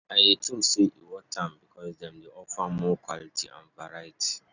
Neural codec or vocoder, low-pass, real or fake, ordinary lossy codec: none; 7.2 kHz; real; none